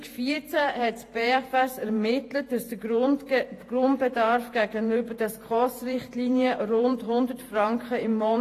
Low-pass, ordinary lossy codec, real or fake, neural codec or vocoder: 14.4 kHz; AAC, 48 kbps; fake; vocoder, 48 kHz, 128 mel bands, Vocos